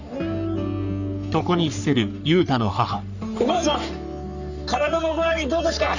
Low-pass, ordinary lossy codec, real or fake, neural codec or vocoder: 7.2 kHz; none; fake; codec, 44.1 kHz, 3.4 kbps, Pupu-Codec